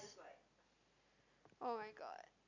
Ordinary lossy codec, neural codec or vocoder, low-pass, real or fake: none; none; 7.2 kHz; real